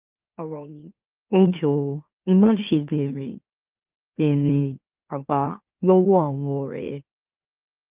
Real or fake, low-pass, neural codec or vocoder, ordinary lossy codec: fake; 3.6 kHz; autoencoder, 44.1 kHz, a latent of 192 numbers a frame, MeloTTS; Opus, 32 kbps